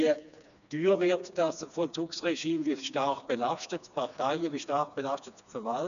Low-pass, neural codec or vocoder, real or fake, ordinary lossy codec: 7.2 kHz; codec, 16 kHz, 2 kbps, FreqCodec, smaller model; fake; none